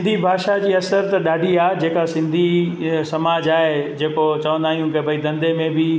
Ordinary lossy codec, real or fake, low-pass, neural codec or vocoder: none; real; none; none